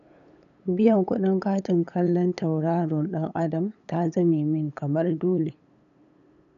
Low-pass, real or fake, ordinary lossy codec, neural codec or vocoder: 7.2 kHz; fake; none; codec, 16 kHz, 8 kbps, FunCodec, trained on LibriTTS, 25 frames a second